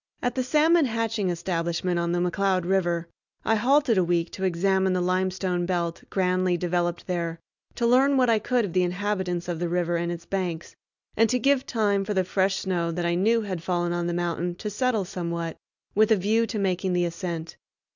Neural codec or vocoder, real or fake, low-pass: none; real; 7.2 kHz